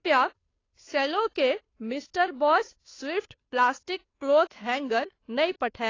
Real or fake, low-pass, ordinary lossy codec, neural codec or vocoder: fake; 7.2 kHz; AAC, 32 kbps; codec, 16 kHz, 2 kbps, FunCodec, trained on Chinese and English, 25 frames a second